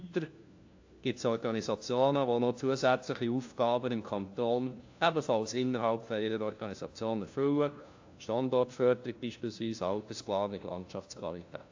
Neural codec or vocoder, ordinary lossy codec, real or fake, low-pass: codec, 16 kHz, 1 kbps, FunCodec, trained on LibriTTS, 50 frames a second; AAC, 48 kbps; fake; 7.2 kHz